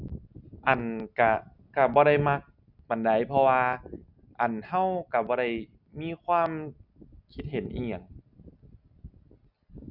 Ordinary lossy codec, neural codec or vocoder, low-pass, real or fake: none; none; 5.4 kHz; real